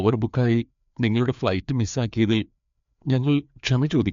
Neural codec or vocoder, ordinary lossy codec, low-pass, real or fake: codec, 16 kHz, 2 kbps, FreqCodec, larger model; MP3, 64 kbps; 7.2 kHz; fake